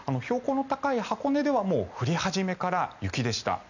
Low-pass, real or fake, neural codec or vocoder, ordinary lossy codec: 7.2 kHz; real; none; none